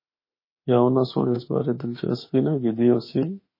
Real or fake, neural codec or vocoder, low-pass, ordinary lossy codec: fake; autoencoder, 48 kHz, 32 numbers a frame, DAC-VAE, trained on Japanese speech; 5.4 kHz; MP3, 24 kbps